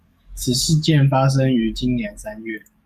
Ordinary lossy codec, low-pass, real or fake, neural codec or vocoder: Opus, 64 kbps; 14.4 kHz; fake; codec, 44.1 kHz, 7.8 kbps, DAC